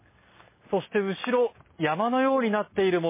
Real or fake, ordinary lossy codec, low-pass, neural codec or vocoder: real; MP3, 16 kbps; 3.6 kHz; none